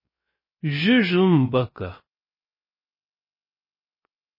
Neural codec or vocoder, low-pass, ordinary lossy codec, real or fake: codec, 16 kHz, 0.3 kbps, FocalCodec; 5.4 kHz; MP3, 24 kbps; fake